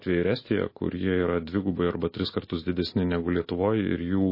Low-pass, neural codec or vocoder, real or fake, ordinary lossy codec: 5.4 kHz; none; real; MP3, 24 kbps